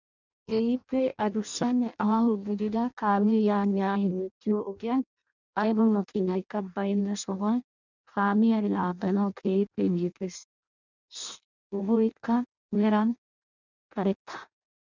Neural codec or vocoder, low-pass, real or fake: codec, 16 kHz in and 24 kHz out, 0.6 kbps, FireRedTTS-2 codec; 7.2 kHz; fake